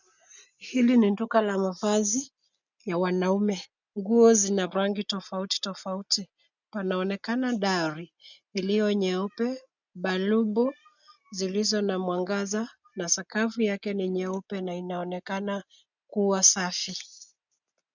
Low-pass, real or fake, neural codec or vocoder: 7.2 kHz; real; none